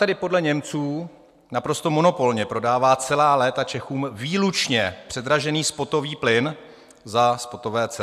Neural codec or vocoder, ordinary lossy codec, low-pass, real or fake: none; AAC, 96 kbps; 14.4 kHz; real